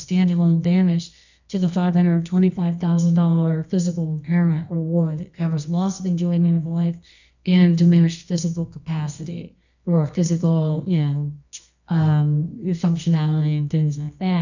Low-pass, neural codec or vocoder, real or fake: 7.2 kHz; codec, 24 kHz, 0.9 kbps, WavTokenizer, medium music audio release; fake